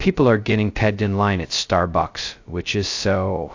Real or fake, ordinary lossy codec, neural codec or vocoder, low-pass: fake; AAC, 48 kbps; codec, 16 kHz, 0.2 kbps, FocalCodec; 7.2 kHz